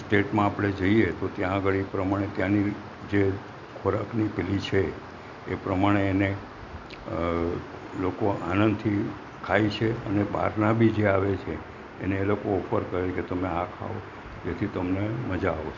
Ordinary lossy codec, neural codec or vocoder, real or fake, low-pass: none; none; real; 7.2 kHz